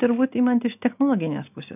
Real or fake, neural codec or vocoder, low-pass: real; none; 3.6 kHz